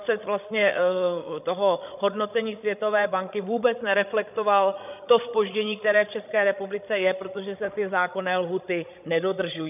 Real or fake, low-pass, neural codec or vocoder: fake; 3.6 kHz; codec, 16 kHz, 16 kbps, FreqCodec, larger model